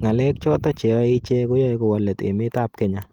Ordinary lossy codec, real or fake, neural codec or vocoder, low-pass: Opus, 24 kbps; real; none; 19.8 kHz